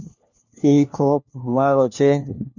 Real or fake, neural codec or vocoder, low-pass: fake; codec, 16 kHz, 1 kbps, FunCodec, trained on LibriTTS, 50 frames a second; 7.2 kHz